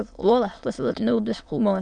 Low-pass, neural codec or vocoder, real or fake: 9.9 kHz; autoencoder, 22.05 kHz, a latent of 192 numbers a frame, VITS, trained on many speakers; fake